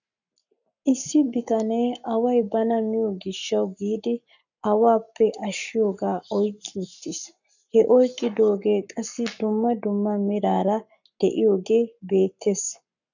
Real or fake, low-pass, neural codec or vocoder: fake; 7.2 kHz; codec, 44.1 kHz, 7.8 kbps, Pupu-Codec